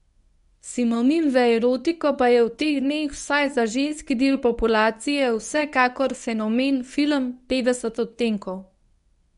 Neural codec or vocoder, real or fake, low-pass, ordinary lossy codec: codec, 24 kHz, 0.9 kbps, WavTokenizer, medium speech release version 1; fake; 10.8 kHz; none